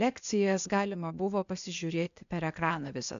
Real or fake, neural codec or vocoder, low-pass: fake; codec, 16 kHz, 0.8 kbps, ZipCodec; 7.2 kHz